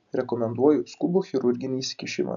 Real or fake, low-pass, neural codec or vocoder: real; 7.2 kHz; none